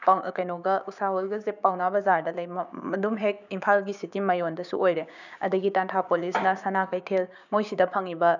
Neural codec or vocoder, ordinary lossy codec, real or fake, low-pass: codec, 16 kHz, 6 kbps, DAC; none; fake; 7.2 kHz